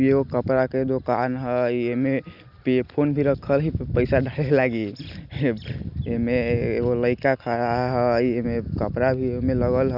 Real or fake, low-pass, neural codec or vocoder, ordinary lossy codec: real; 5.4 kHz; none; none